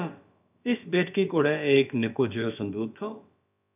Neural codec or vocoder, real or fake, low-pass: codec, 16 kHz, about 1 kbps, DyCAST, with the encoder's durations; fake; 3.6 kHz